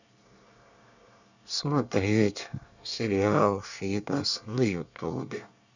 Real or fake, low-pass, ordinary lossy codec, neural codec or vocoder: fake; 7.2 kHz; none; codec, 24 kHz, 1 kbps, SNAC